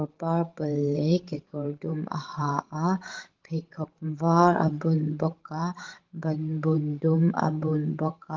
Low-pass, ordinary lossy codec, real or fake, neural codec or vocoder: 7.2 kHz; Opus, 16 kbps; fake; codec, 16 kHz, 16 kbps, FreqCodec, larger model